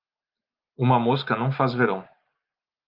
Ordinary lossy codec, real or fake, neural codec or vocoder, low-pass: Opus, 24 kbps; real; none; 5.4 kHz